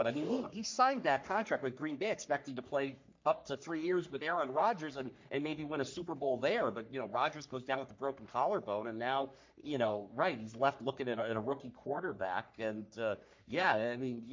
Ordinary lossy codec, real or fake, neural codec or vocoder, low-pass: MP3, 48 kbps; fake; codec, 44.1 kHz, 3.4 kbps, Pupu-Codec; 7.2 kHz